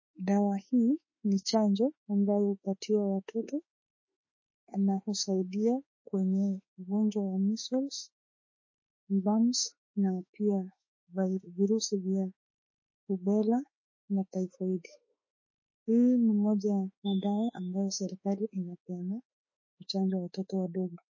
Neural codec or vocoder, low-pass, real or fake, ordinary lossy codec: autoencoder, 48 kHz, 32 numbers a frame, DAC-VAE, trained on Japanese speech; 7.2 kHz; fake; MP3, 32 kbps